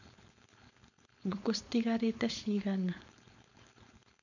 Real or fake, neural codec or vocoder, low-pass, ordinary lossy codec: fake; codec, 16 kHz, 4.8 kbps, FACodec; 7.2 kHz; none